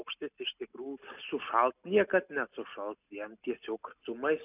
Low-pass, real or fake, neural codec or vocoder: 3.6 kHz; real; none